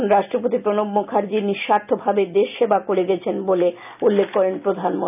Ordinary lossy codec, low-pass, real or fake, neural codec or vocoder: none; 3.6 kHz; real; none